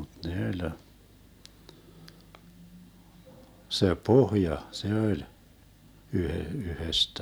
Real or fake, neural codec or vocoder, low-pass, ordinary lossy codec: real; none; none; none